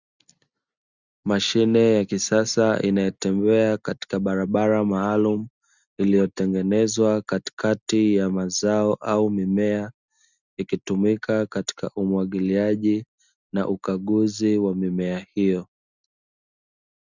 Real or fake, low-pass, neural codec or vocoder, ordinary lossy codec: real; 7.2 kHz; none; Opus, 64 kbps